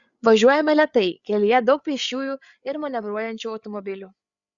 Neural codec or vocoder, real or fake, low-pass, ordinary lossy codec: codec, 16 kHz, 8 kbps, FreqCodec, larger model; fake; 7.2 kHz; Opus, 64 kbps